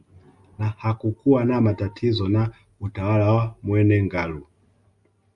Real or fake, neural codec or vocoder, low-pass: real; none; 10.8 kHz